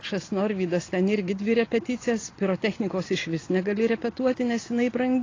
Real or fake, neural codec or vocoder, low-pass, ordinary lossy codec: real; none; 7.2 kHz; AAC, 32 kbps